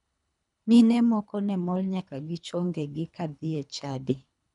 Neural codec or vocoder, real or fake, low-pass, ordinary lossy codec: codec, 24 kHz, 3 kbps, HILCodec; fake; 10.8 kHz; none